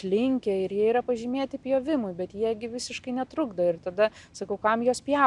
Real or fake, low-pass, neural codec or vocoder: real; 10.8 kHz; none